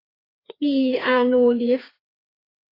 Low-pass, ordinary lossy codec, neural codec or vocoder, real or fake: 5.4 kHz; AAC, 24 kbps; codec, 16 kHz, 2 kbps, FreqCodec, larger model; fake